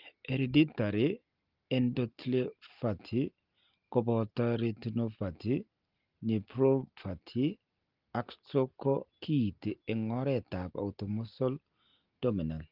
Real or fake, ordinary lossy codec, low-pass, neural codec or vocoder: real; Opus, 32 kbps; 5.4 kHz; none